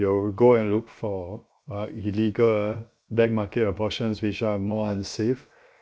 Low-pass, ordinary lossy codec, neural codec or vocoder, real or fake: none; none; codec, 16 kHz, 0.7 kbps, FocalCodec; fake